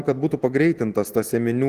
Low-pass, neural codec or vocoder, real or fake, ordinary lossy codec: 14.4 kHz; none; real; Opus, 16 kbps